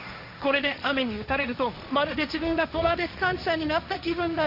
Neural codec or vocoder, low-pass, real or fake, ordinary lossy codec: codec, 16 kHz, 1.1 kbps, Voila-Tokenizer; 5.4 kHz; fake; none